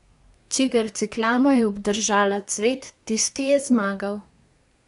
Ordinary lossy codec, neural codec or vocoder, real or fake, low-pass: none; codec, 24 kHz, 1 kbps, SNAC; fake; 10.8 kHz